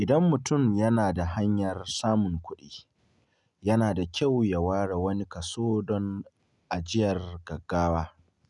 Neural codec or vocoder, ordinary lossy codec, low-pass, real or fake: none; none; 10.8 kHz; real